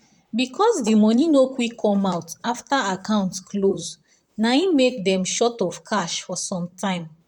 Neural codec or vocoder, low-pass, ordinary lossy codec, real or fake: vocoder, 44.1 kHz, 128 mel bands, Pupu-Vocoder; 19.8 kHz; none; fake